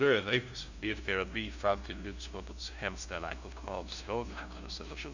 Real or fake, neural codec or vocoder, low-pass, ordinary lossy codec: fake; codec, 16 kHz, 0.5 kbps, FunCodec, trained on LibriTTS, 25 frames a second; 7.2 kHz; none